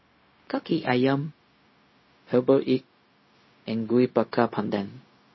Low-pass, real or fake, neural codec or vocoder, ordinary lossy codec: 7.2 kHz; fake; codec, 16 kHz, 0.4 kbps, LongCat-Audio-Codec; MP3, 24 kbps